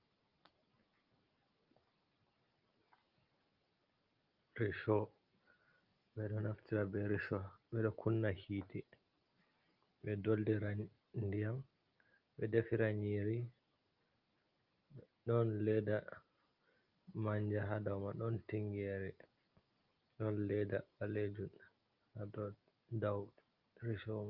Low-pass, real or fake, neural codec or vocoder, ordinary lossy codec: 5.4 kHz; real; none; Opus, 24 kbps